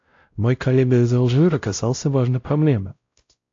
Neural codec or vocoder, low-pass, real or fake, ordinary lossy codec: codec, 16 kHz, 0.5 kbps, X-Codec, WavLM features, trained on Multilingual LibriSpeech; 7.2 kHz; fake; AAC, 48 kbps